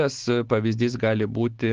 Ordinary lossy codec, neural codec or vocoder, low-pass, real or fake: Opus, 16 kbps; none; 7.2 kHz; real